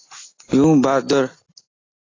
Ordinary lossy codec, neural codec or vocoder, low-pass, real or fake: AAC, 32 kbps; none; 7.2 kHz; real